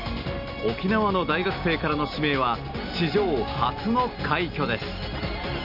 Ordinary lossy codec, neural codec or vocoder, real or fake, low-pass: none; none; real; 5.4 kHz